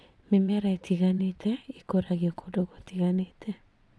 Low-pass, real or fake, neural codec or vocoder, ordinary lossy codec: none; fake; vocoder, 22.05 kHz, 80 mel bands, WaveNeXt; none